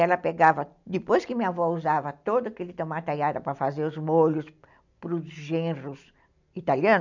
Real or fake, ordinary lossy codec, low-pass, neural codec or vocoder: real; none; 7.2 kHz; none